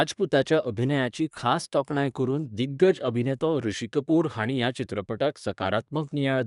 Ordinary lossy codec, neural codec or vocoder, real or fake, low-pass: none; codec, 24 kHz, 1 kbps, SNAC; fake; 10.8 kHz